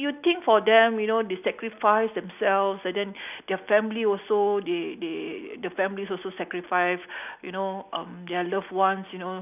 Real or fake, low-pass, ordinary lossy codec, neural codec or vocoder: real; 3.6 kHz; none; none